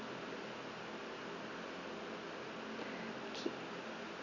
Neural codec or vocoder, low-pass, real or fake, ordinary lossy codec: none; 7.2 kHz; real; none